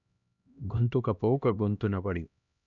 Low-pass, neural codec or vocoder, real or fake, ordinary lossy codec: 7.2 kHz; codec, 16 kHz, 1 kbps, X-Codec, HuBERT features, trained on LibriSpeech; fake; none